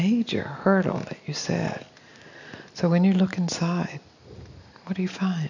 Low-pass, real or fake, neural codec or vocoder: 7.2 kHz; real; none